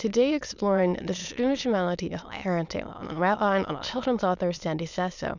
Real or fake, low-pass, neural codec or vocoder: fake; 7.2 kHz; autoencoder, 22.05 kHz, a latent of 192 numbers a frame, VITS, trained on many speakers